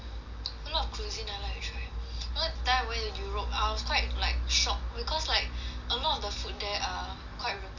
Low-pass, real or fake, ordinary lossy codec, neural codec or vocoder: 7.2 kHz; real; none; none